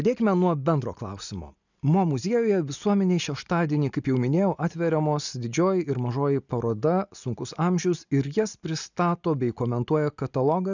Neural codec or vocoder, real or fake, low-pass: none; real; 7.2 kHz